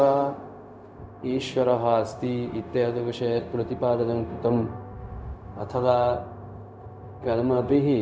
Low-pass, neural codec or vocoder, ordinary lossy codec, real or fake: none; codec, 16 kHz, 0.4 kbps, LongCat-Audio-Codec; none; fake